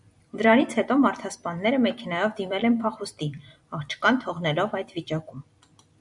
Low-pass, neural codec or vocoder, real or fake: 10.8 kHz; vocoder, 44.1 kHz, 128 mel bands every 256 samples, BigVGAN v2; fake